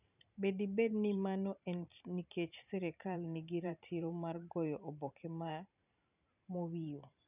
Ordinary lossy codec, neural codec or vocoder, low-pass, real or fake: none; vocoder, 44.1 kHz, 128 mel bands every 512 samples, BigVGAN v2; 3.6 kHz; fake